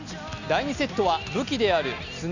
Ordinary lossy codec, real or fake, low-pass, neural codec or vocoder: none; real; 7.2 kHz; none